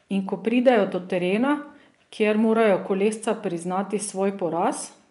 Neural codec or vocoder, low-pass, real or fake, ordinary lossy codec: vocoder, 24 kHz, 100 mel bands, Vocos; 10.8 kHz; fake; MP3, 96 kbps